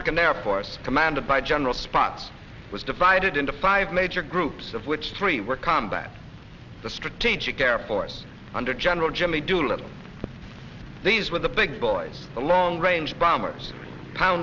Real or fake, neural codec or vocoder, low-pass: real; none; 7.2 kHz